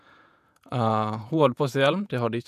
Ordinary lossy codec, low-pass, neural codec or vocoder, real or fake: none; 14.4 kHz; vocoder, 48 kHz, 128 mel bands, Vocos; fake